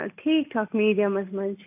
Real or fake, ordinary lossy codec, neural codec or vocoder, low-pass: real; AAC, 32 kbps; none; 3.6 kHz